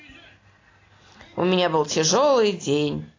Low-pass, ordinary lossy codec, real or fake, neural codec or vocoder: 7.2 kHz; AAC, 32 kbps; real; none